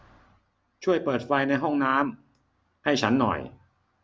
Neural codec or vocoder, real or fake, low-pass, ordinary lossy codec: none; real; none; none